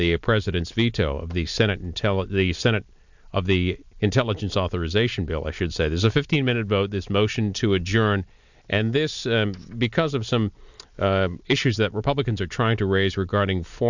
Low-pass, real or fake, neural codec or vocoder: 7.2 kHz; real; none